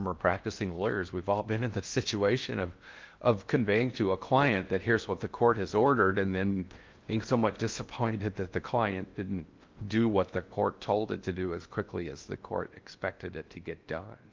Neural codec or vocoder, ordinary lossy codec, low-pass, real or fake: codec, 16 kHz in and 24 kHz out, 0.6 kbps, FocalCodec, streaming, 4096 codes; Opus, 32 kbps; 7.2 kHz; fake